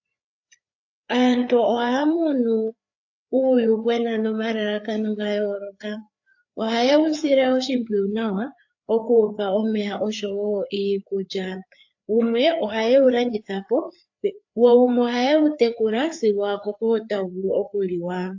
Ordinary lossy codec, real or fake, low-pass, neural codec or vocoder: AAC, 48 kbps; fake; 7.2 kHz; codec, 16 kHz, 4 kbps, FreqCodec, larger model